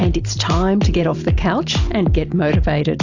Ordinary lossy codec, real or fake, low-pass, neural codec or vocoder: AAC, 48 kbps; real; 7.2 kHz; none